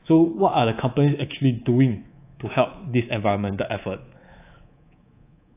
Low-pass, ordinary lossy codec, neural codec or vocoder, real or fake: 3.6 kHz; AAC, 24 kbps; codec, 24 kHz, 3.1 kbps, DualCodec; fake